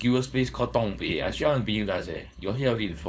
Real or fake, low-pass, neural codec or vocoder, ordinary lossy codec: fake; none; codec, 16 kHz, 4.8 kbps, FACodec; none